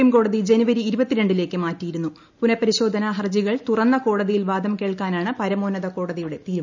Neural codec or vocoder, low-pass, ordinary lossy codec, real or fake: none; 7.2 kHz; none; real